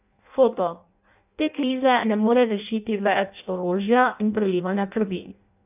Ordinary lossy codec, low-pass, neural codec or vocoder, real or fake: none; 3.6 kHz; codec, 16 kHz in and 24 kHz out, 0.6 kbps, FireRedTTS-2 codec; fake